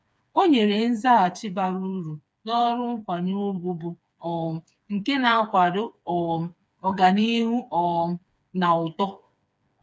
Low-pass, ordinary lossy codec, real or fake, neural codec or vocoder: none; none; fake; codec, 16 kHz, 4 kbps, FreqCodec, smaller model